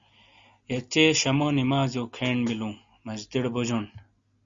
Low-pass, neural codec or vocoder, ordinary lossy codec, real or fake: 7.2 kHz; none; Opus, 64 kbps; real